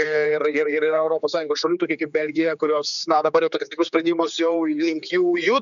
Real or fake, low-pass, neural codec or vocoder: fake; 7.2 kHz; codec, 16 kHz, 4 kbps, X-Codec, HuBERT features, trained on general audio